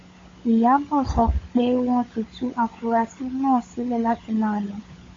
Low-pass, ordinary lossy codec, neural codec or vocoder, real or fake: 7.2 kHz; AAC, 32 kbps; codec, 16 kHz, 16 kbps, FunCodec, trained on LibriTTS, 50 frames a second; fake